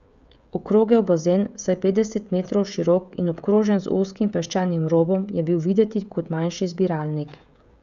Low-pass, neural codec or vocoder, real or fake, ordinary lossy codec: 7.2 kHz; codec, 16 kHz, 16 kbps, FreqCodec, smaller model; fake; none